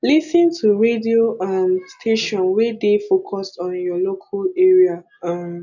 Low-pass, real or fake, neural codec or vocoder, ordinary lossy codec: 7.2 kHz; real; none; none